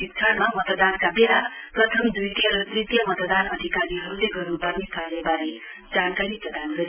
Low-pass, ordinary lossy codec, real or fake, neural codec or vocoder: 3.6 kHz; none; real; none